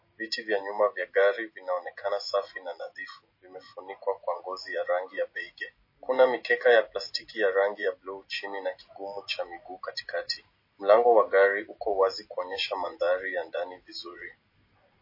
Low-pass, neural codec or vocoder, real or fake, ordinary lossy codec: 5.4 kHz; none; real; MP3, 24 kbps